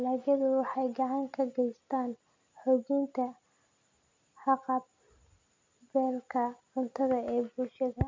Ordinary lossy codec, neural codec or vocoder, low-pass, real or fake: MP3, 64 kbps; none; 7.2 kHz; real